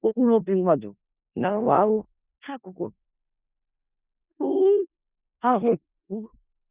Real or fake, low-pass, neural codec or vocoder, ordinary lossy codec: fake; 3.6 kHz; codec, 16 kHz in and 24 kHz out, 0.4 kbps, LongCat-Audio-Codec, four codebook decoder; Opus, 64 kbps